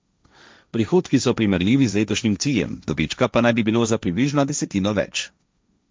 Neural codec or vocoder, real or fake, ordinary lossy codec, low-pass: codec, 16 kHz, 1.1 kbps, Voila-Tokenizer; fake; none; none